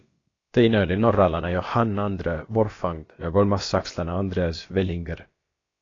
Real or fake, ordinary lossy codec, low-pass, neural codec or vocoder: fake; AAC, 32 kbps; 7.2 kHz; codec, 16 kHz, about 1 kbps, DyCAST, with the encoder's durations